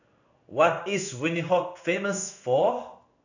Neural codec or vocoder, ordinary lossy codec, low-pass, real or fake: codec, 16 kHz in and 24 kHz out, 1 kbps, XY-Tokenizer; none; 7.2 kHz; fake